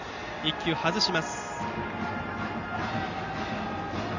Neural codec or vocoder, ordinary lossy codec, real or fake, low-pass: none; none; real; 7.2 kHz